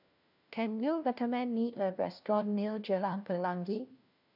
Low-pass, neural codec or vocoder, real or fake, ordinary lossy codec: 5.4 kHz; codec, 16 kHz, 1 kbps, FunCodec, trained on LibriTTS, 50 frames a second; fake; none